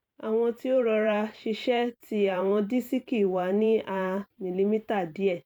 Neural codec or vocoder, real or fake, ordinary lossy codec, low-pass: vocoder, 44.1 kHz, 128 mel bands every 512 samples, BigVGAN v2; fake; none; 19.8 kHz